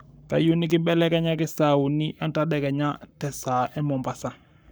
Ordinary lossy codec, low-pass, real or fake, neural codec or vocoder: none; none; fake; codec, 44.1 kHz, 7.8 kbps, Pupu-Codec